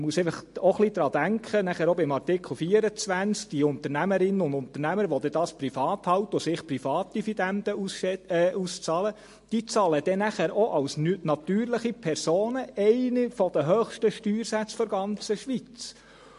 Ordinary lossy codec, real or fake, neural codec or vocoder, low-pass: MP3, 48 kbps; real; none; 14.4 kHz